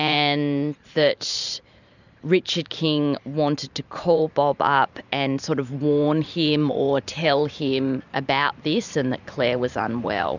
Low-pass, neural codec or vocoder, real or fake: 7.2 kHz; vocoder, 44.1 kHz, 128 mel bands every 512 samples, BigVGAN v2; fake